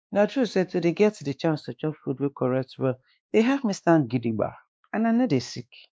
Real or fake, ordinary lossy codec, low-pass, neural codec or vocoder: fake; none; none; codec, 16 kHz, 2 kbps, X-Codec, WavLM features, trained on Multilingual LibriSpeech